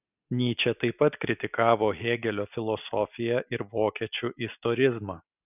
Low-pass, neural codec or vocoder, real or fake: 3.6 kHz; none; real